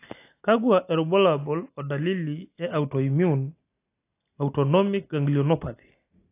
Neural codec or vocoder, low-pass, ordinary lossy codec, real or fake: none; 3.6 kHz; AAC, 24 kbps; real